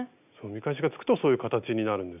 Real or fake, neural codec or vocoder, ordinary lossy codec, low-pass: real; none; none; 3.6 kHz